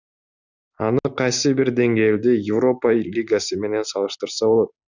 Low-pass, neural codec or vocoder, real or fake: 7.2 kHz; none; real